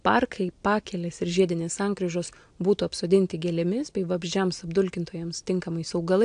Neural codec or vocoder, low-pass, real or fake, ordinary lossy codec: none; 9.9 kHz; real; AAC, 64 kbps